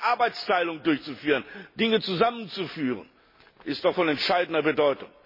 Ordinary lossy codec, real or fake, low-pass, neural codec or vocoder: MP3, 24 kbps; real; 5.4 kHz; none